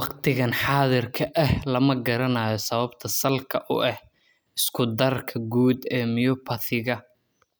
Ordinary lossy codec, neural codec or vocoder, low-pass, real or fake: none; none; none; real